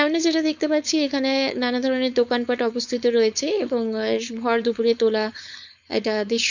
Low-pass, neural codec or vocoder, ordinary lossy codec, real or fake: 7.2 kHz; codec, 16 kHz, 4.8 kbps, FACodec; none; fake